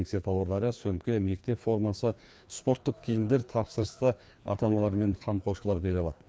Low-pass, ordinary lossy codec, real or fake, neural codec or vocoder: none; none; fake; codec, 16 kHz, 2 kbps, FreqCodec, larger model